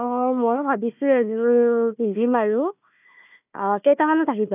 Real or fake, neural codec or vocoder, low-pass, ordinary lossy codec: fake; codec, 16 kHz, 1 kbps, FunCodec, trained on Chinese and English, 50 frames a second; 3.6 kHz; none